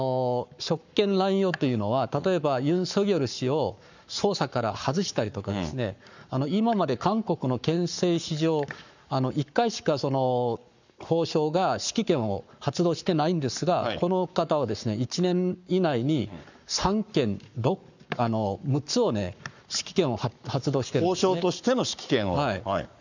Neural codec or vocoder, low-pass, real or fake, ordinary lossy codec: codec, 44.1 kHz, 7.8 kbps, Pupu-Codec; 7.2 kHz; fake; none